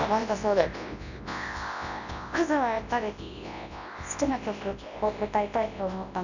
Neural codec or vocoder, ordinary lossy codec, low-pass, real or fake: codec, 24 kHz, 0.9 kbps, WavTokenizer, large speech release; none; 7.2 kHz; fake